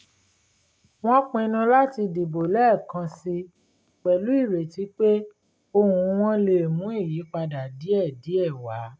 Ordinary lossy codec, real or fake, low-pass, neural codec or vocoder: none; real; none; none